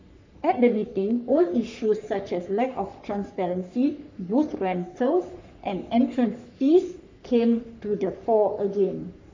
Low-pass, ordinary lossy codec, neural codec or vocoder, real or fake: 7.2 kHz; MP3, 64 kbps; codec, 44.1 kHz, 3.4 kbps, Pupu-Codec; fake